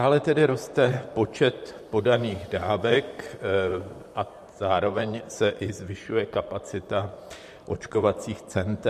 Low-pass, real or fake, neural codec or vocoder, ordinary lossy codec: 14.4 kHz; fake; vocoder, 44.1 kHz, 128 mel bands, Pupu-Vocoder; MP3, 64 kbps